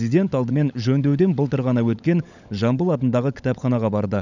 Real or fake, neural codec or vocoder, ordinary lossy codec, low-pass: fake; codec, 16 kHz, 16 kbps, FunCodec, trained on LibriTTS, 50 frames a second; none; 7.2 kHz